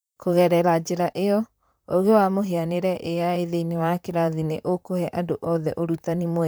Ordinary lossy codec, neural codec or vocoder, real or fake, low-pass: none; vocoder, 44.1 kHz, 128 mel bands, Pupu-Vocoder; fake; none